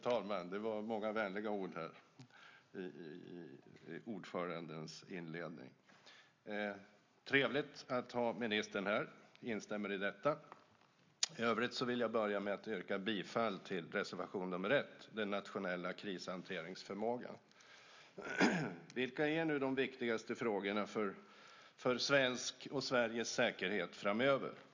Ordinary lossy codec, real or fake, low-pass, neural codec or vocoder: MP3, 64 kbps; real; 7.2 kHz; none